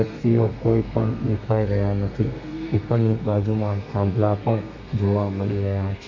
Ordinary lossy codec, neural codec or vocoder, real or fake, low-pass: AAC, 48 kbps; codec, 32 kHz, 1.9 kbps, SNAC; fake; 7.2 kHz